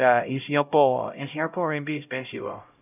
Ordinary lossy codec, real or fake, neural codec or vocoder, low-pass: none; fake; codec, 16 kHz, 0.5 kbps, X-Codec, HuBERT features, trained on LibriSpeech; 3.6 kHz